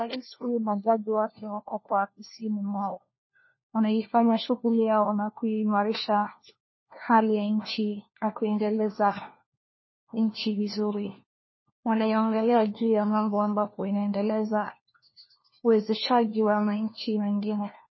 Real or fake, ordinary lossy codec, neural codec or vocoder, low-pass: fake; MP3, 24 kbps; codec, 16 kHz, 1 kbps, FunCodec, trained on LibriTTS, 50 frames a second; 7.2 kHz